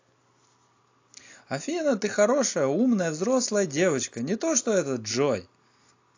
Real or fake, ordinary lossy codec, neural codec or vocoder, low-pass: real; AAC, 48 kbps; none; 7.2 kHz